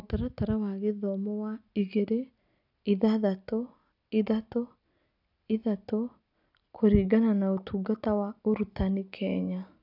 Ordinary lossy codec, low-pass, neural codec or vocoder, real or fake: none; 5.4 kHz; none; real